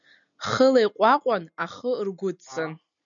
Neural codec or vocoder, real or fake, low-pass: none; real; 7.2 kHz